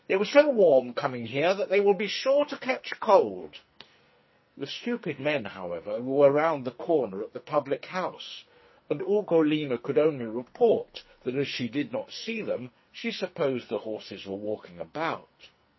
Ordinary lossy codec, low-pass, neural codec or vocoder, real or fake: MP3, 24 kbps; 7.2 kHz; codec, 44.1 kHz, 2.6 kbps, SNAC; fake